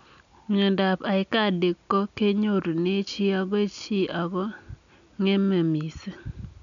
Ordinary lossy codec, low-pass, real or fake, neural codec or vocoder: none; 7.2 kHz; real; none